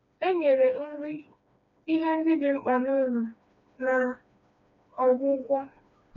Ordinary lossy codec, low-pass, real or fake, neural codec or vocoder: none; 7.2 kHz; fake; codec, 16 kHz, 2 kbps, FreqCodec, smaller model